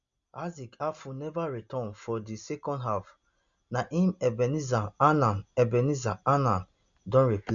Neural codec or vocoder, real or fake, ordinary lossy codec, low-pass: none; real; none; 7.2 kHz